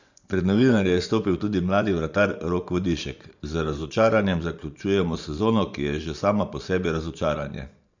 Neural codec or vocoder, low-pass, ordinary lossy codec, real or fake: vocoder, 24 kHz, 100 mel bands, Vocos; 7.2 kHz; none; fake